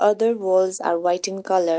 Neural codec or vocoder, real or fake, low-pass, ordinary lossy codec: none; real; none; none